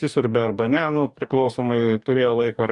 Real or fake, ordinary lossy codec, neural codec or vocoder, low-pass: fake; Opus, 64 kbps; codec, 44.1 kHz, 2.6 kbps, DAC; 10.8 kHz